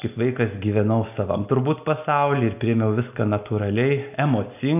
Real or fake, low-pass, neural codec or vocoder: real; 3.6 kHz; none